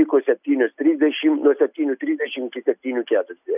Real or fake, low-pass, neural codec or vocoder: real; 3.6 kHz; none